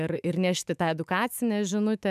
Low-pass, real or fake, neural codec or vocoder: 14.4 kHz; fake; autoencoder, 48 kHz, 128 numbers a frame, DAC-VAE, trained on Japanese speech